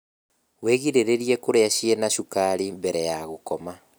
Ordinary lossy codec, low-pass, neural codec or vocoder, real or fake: none; none; none; real